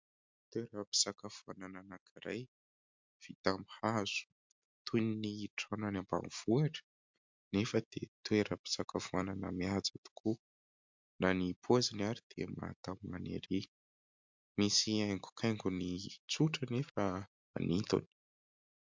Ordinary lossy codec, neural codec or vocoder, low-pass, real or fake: MP3, 64 kbps; none; 7.2 kHz; real